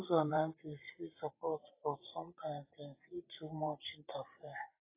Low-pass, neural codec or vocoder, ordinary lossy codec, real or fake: 3.6 kHz; vocoder, 44.1 kHz, 128 mel bands every 512 samples, BigVGAN v2; AAC, 32 kbps; fake